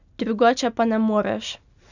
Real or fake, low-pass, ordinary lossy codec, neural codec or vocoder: real; 7.2 kHz; none; none